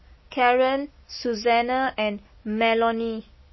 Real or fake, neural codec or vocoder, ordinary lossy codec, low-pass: real; none; MP3, 24 kbps; 7.2 kHz